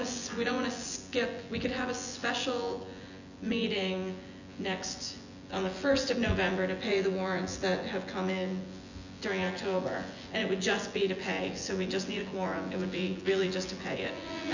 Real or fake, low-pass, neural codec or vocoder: fake; 7.2 kHz; vocoder, 24 kHz, 100 mel bands, Vocos